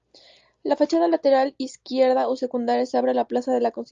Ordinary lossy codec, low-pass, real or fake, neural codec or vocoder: Opus, 32 kbps; 7.2 kHz; real; none